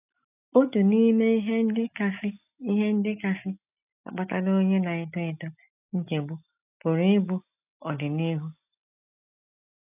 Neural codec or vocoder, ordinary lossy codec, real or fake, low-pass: none; none; real; 3.6 kHz